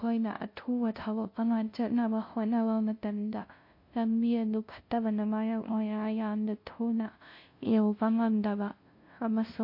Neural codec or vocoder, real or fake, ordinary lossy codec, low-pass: codec, 16 kHz, 0.5 kbps, FunCodec, trained on LibriTTS, 25 frames a second; fake; MP3, 32 kbps; 5.4 kHz